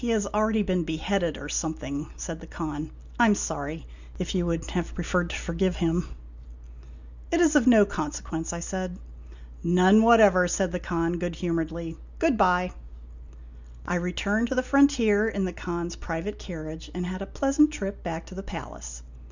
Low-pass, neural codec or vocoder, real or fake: 7.2 kHz; none; real